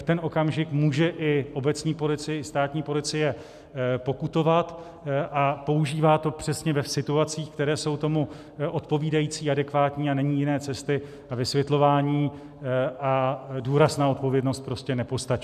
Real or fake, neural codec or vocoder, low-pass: real; none; 14.4 kHz